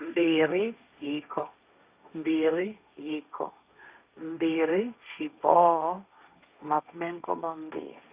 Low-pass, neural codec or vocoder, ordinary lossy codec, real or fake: 3.6 kHz; codec, 16 kHz, 1.1 kbps, Voila-Tokenizer; Opus, 64 kbps; fake